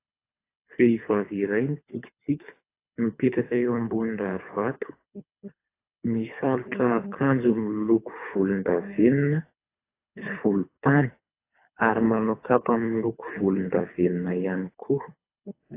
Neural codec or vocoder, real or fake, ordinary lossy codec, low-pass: codec, 24 kHz, 3 kbps, HILCodec; fake; AAC, 24 kbps; 3.6 kHz